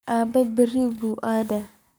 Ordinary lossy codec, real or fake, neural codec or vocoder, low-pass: none; fake; codec, 44.1 kHz, 7.8 kbps, Pupu-Codec; none